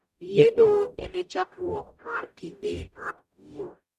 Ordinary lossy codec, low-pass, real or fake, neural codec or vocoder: none; 14.4 kHz; fake; codec, 44.1 kHz, 0.9 kbps, DAC